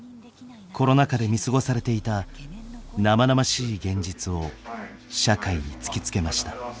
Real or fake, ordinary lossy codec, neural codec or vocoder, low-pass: real; none; none; none